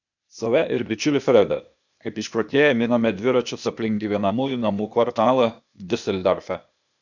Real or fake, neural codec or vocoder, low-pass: fake; codec, 16 kHz, 0.8 kbps, ZipCodec; 7.2 kHz